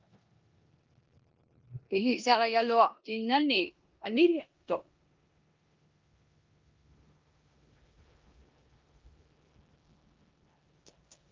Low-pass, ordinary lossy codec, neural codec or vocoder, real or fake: 7.2 kHz; Opus, 24 kbps; codec, 16 kHz in and 24 kHz out, 0.9 kbps, LongCat-Audio-Codec, four codebook decoder; fake